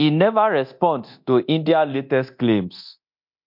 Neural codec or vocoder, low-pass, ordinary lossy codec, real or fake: codec, 24 kHz, 0.9 kbps, DualCodec; 5.4 kHz; MP3, 48 kbps; fake